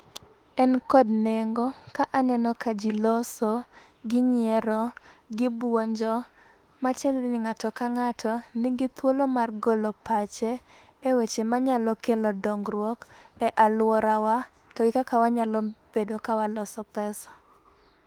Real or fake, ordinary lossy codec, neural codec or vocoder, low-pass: fake; Opus, 24 kbps; autoencoder, 48 kHz, 32 numbers a frame, DAC-VAE, trained on Japanese speech; 19.8 kHz